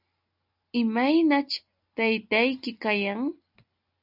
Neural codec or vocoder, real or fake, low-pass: none; real; 5.4 kHz